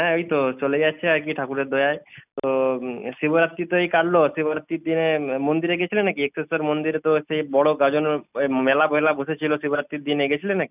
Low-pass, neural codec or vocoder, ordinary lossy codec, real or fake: 3.6 kHz; none; none; real